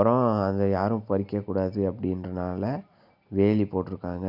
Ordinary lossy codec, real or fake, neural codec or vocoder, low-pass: none; real; none; 5.4 kHz